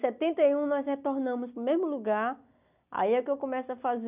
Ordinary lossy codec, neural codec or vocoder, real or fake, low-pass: none; none; real; 3.6 kHz